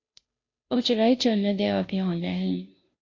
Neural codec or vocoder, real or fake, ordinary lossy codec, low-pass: codec, 16 kHz, 0.5 kbps, FunCodec, trained on Chinese and English, 25 frames a second; fake; AAC, 32 kbps; 7.2 kHz